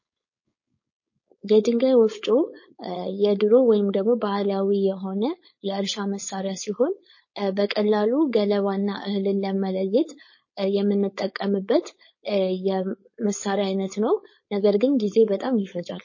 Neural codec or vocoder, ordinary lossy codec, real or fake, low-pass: codec, 16 kHz, 4.8 kbps, FACodec; MP3, 32 kbps; fake; 7.2 kHz